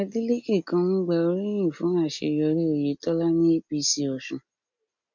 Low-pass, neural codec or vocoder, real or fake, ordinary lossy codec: 7.2 kHz; none; real; none